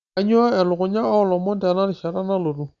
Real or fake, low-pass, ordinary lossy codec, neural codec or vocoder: real; 7.2 kHz; Opus, 64 kbps; none